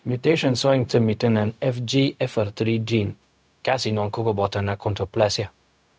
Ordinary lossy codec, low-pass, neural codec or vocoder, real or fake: none; none; codec, 16 kHz, 0.4 kbps, LongCat-Audio-Codec; fake